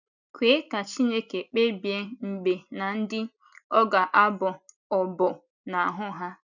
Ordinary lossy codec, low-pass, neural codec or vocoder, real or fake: none; 7.2 kHz; none; real